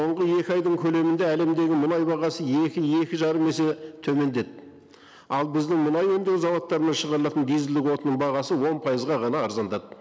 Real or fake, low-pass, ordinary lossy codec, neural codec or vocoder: real; none; none; none